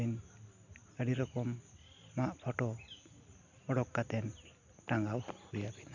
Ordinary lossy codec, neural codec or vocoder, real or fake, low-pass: none; none; real; 7.2 kHz